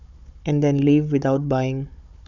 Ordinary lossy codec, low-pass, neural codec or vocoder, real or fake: none; 7.2 kHz; codec, 16 kHz, 16 kbps, FunCodec, trained on Chinese and English, 50 frames a second; fake